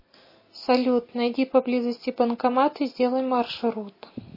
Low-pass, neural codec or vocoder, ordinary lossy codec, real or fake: 5.4 kHz; none; MP3, 24 kbps; real